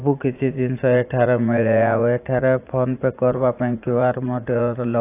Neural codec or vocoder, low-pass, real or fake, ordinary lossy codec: vocoder, 44.1 kHz, 80 mel bands, Vocos; 3.6 kHz; fake; AAC, 24 kbps